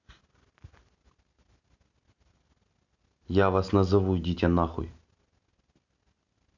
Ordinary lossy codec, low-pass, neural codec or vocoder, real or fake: none; 7.2 kHz; none; real